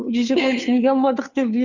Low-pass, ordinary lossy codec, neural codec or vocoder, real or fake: 7.2 kHz; none; codec, 16 kHz, 2 kbps, FunCodec, trained on Chinese and English, 25 frames a second; fake